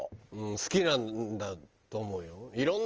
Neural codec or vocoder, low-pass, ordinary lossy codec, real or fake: none; 7.2 kHz; Opus, 24 kbps; real